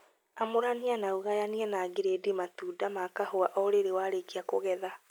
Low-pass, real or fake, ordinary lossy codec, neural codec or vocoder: none; real; none; none